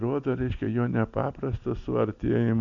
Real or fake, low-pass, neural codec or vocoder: real; 7.2 kHz; none